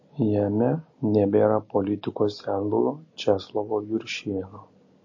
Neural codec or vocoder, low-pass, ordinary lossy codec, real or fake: none; 7.2 kHz; MP3, 32 kbps; real